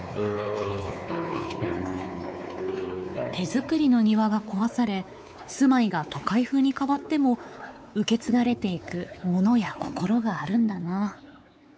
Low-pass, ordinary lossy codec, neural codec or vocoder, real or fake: none; none; codec, 16 kHz, 4 kbps, X-Codec, WavLM features, trained on Multilingual LibriSpeech; fake